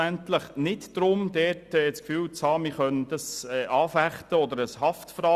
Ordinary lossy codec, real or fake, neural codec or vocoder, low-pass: Opus, 64 kbps; real; none; 14.4 kHz